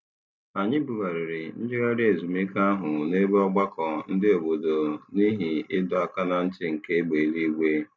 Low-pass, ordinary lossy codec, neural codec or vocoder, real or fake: none; none; none; real